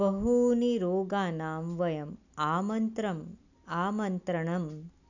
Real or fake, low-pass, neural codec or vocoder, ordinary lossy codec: real; 7.2 kHz; none; none